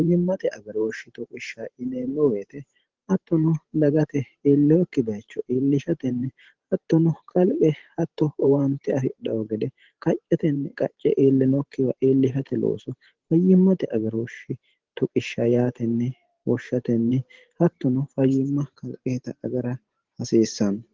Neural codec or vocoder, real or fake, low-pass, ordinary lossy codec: vocoder, 44.1 kHz, 128 mel bands every 512 samples, BigVGAN v2; fake; 7.2 kHz; Opus, 16 kbps